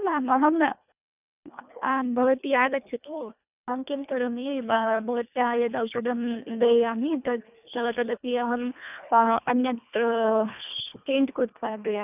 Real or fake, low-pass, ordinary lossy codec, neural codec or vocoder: fake; 3.6 kHz; none; codec, 24 kHz, 1.5 kbps, HILCodec